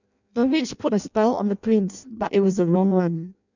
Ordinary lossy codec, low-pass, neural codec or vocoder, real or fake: none; 7.2 kHz; codec, 16 kHz in and 24 kHz out, 0.6 kbps, FireRedTTS-2 codec; fake